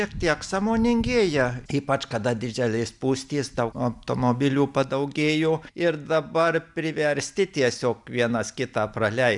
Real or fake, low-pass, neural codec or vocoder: real; 10.8 kHz; none